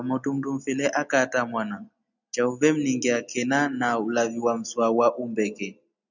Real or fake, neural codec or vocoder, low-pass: real; none; 7.2 kHz